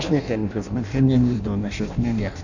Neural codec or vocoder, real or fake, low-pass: codec, 16 kHz in and 24 kHz out, 0.6 kbps, FireRedTTS-2 codec; fake; 7.2 kHz